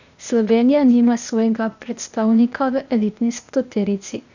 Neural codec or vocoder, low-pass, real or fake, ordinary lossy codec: codec, 16 kHz, 0.8 kbps, ZipCodec; 7.2 kHz; fake; none